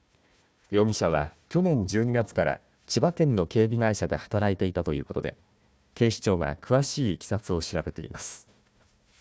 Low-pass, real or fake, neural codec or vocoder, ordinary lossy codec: none; fake; codec, 16 kHz, 1 kbps, FunCodec, trained on Chinese and English, 50 frames a second; none